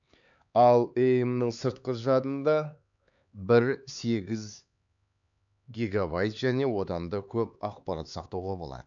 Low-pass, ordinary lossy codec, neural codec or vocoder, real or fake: 7.2 kHz; none; codec, 16 kHz, 4 kbps, X-Codec, HuBERT features, trained on LibriSpeech; fake